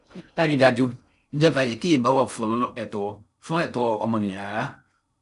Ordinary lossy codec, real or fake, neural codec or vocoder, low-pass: none; fake; codec, 16 kHz in and 24 kHz out, 0.6 kbps, FocalCodec, streaming, 4096 codes; 10.8 kHz